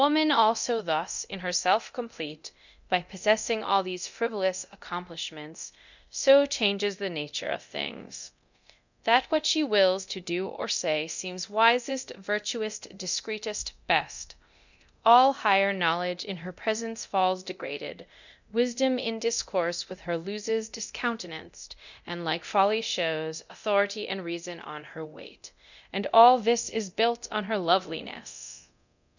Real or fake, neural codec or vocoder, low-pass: fake; codec, 24 kHz, 0.9 kbps, DualCodec; 7.2 kHz